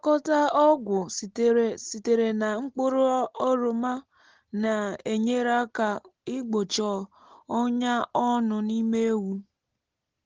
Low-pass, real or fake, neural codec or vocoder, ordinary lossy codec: 7.2 kHz; real; none; Opus, 16 kbps